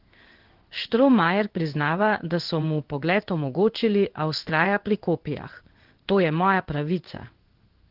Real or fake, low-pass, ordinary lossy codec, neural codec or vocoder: fake; 5.4 kHz; Opus, 16 kbps; codec, 16 kHz in and 24 kHz out, 1 kbps, XY-Tokenizer